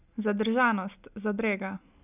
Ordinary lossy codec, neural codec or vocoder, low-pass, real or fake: none; none; 3.6 kHz; real